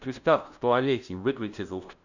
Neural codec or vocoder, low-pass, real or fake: codec, 16 kHz, 0.5 kbps, FunCodec, trained on LibriTTS, 25 frames a second; 7.2 kHz; fake